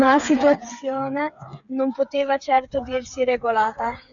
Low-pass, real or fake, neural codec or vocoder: 7.2 kHz; fake; codec, 16 kHz, 8 kbps, FreqCodec, smaller model